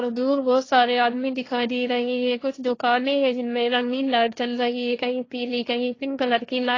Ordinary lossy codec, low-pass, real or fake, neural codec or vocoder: AAC, 32 kbps; 7.2 kHz; fake; codec, 16 kHz, 1.1 kbps, Voila-Tokenizer